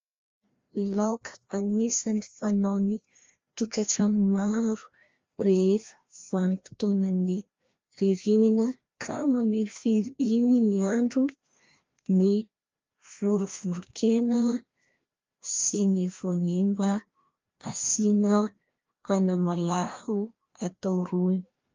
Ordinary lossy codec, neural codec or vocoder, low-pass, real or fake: Opus, 32 kbps; codec, 16 kHz, 1 kbps, FreqCodec, larger model; 7.2 kHz; fake